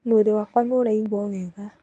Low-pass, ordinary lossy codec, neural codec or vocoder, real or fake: 10.8 kHz; MP3, 64 kbps; codec, 24 kHz, 0.9 kbps, WavTokenizer, medium speech release version 1; fake